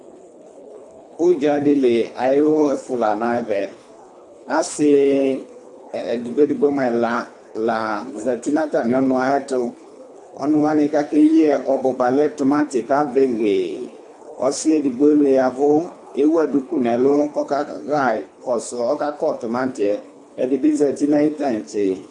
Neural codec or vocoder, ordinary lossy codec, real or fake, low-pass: codec, 24 kHz, 3 kbps, HILCodec; AAC, 64 kbps; fake; 10.8 kHz